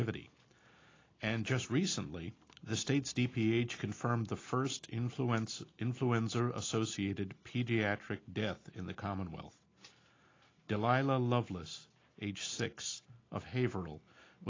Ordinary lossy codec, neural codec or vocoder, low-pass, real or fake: AAC, 32 kbps; none; 7.2 kHz; real